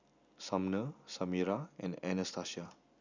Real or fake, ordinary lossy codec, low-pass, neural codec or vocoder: real; none; 7.2 kHz; none